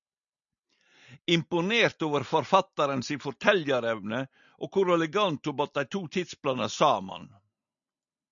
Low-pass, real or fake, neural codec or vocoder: 7.2 kHz; real; none